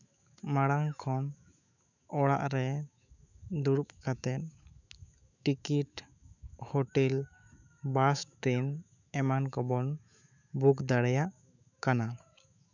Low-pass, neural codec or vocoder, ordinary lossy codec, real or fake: 7.2 kHz; autoencoder, 48 kHz, 128 numbers a frame, DAC-VAE, trained on Japanese speech; none; fake